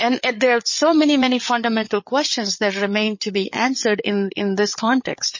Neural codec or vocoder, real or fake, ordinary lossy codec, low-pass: codec, 16 kHz, 8 kbps, FreqCodec, larger model; fake; MP3, 32 kbps; 7.2 kHz